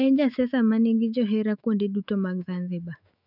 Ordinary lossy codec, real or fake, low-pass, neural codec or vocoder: none; fake; 5.4 kHz; autoencoder, 48 kHz, 128 numbers a frame, DAC-VAE, trained on Japanese speech